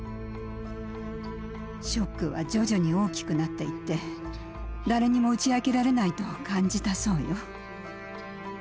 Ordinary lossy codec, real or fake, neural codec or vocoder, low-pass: none; real; none; none